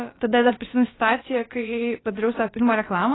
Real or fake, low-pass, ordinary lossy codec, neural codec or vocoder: fake; 7.2 kHz; AAC, 16 kbps; codec, 16 kHz, about 1 kbps, DyCAST, with the encoder's durations